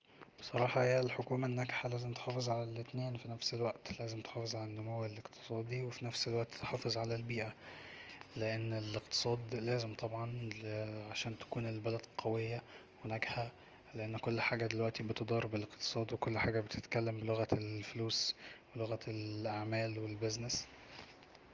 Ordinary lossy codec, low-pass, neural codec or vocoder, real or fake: Opus, 24 kbps; 7.2 kHz; none; real